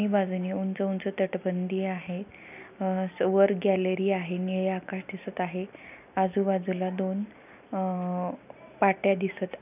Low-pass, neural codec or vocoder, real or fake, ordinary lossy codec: 3.6 kHz; none; real; none